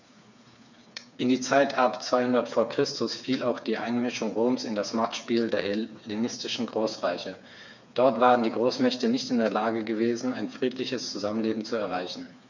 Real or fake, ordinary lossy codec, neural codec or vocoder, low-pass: fake; none; codec, 16 kHz, 4 kbps, FreqCodec, smaller model; 7.2 kHz